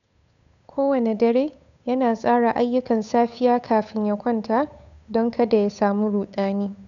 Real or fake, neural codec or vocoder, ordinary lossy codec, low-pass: fake; codec, 16 kHz, 8 kbps, FunCodec, trained on Chinese and English, 25 frames a second; none; 7.2 kHz